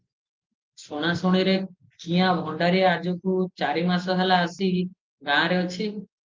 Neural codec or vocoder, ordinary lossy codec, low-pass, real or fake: none; Opus, 32 kbps; 7.2 kHz; real